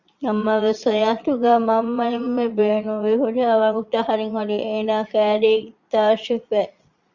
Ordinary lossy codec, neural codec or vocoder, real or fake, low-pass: Opus, 64 kbps; vocoder, 22.05 kHz, 80 mel bands, Vocos; fake; 7.2 kHz